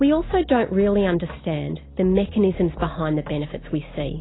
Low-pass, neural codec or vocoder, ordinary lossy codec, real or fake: 7.2 kHz; none; AAC, 16 kbps; real